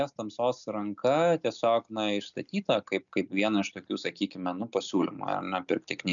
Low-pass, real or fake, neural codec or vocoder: 7.2 kHz; real; none